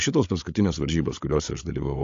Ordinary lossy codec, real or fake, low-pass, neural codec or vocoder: AAC, 48 kbps; fake; 7.2 kHz; codec, 16 kHz, 8 kbps, FreqCodec, larger model